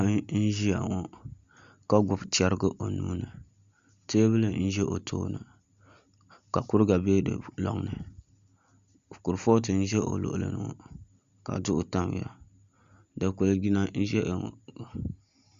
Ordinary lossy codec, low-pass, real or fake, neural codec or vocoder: Opus, 64 kbps; 7.2 kHz; real; none